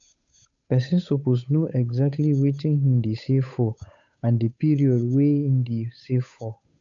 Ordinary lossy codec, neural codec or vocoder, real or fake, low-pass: AAC, 64 kbps; codec, 16 kHz, 8 kbps, FunCodec, trained on Chinese and English, 25 frames a second; fake; 7.2 kHz